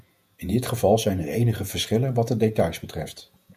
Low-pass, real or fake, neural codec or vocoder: 14.4 kHz; fake; vocoder, 48 kHz, 128 mel bands, Vocos